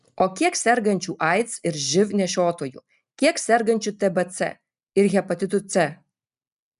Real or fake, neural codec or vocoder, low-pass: real; none; 10.8 kHz